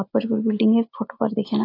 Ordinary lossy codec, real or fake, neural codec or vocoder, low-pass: AAC, 32 kbps; real; none; 5.4 kHz